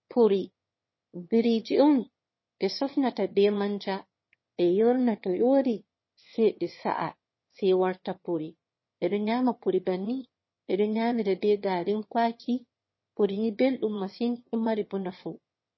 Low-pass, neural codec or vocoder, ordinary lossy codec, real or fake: 7.2 kHz; autoencoder, 22.05 kHz, a latent of 192 numbers a frame, VITS, trained on one speaker; MP3, 24 kbps; fake